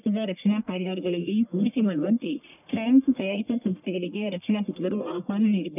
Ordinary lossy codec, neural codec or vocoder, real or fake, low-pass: none; codec, 44.1 kHz, 1.7 kbps, Pupu-Codec; fake; 3.6 kHz